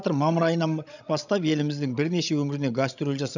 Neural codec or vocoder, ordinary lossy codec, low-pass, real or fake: codec, 16 kHz, 16 kbps, FreqCodec, larger model; none; 7.2 kHz; fake